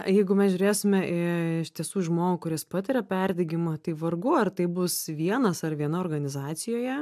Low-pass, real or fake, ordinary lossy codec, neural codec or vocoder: 14.4 kHz; real; AAC, 96 kbps; none